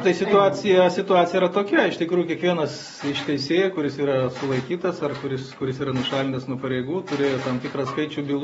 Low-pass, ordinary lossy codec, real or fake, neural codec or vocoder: 19.8 kHz; AAC, 24 kbps; real; none